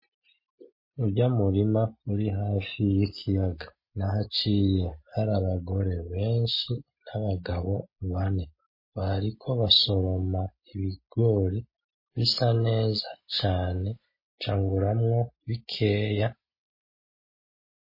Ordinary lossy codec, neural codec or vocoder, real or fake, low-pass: MP3, 24 kbps; none; real; 5.4 kHz